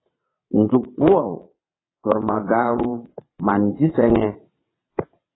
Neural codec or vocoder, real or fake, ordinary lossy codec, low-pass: vocoder, 22.05 kHz, 80 mel bands, WaveNeXt; fake; AAC, 16 kbps; 7.2 kHz